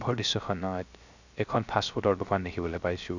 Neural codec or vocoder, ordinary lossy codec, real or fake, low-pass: codec, 16 kHz, 0.3 kbps, FocalCodec; none; fake; 7.2 kHz